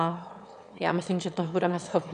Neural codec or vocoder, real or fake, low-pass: autoencoder, 22.05 kHz, a latent of 192 numbers a frame, VITS, trained on one speaker; fake; 9.9 kHz